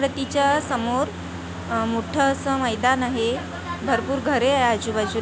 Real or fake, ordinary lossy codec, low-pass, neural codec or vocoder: real; none; none; none